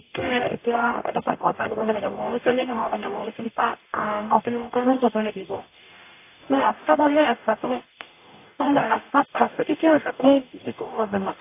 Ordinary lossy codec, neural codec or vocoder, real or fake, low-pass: AAC, 24 kbps; codec, 44.1 kHz, 0.9 kbps, DAC; fake; 3.6 kHz